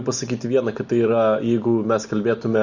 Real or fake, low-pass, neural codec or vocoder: real; 7.2 kHz; none